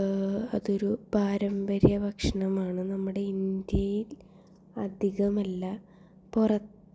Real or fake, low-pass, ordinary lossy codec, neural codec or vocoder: real; none; none; none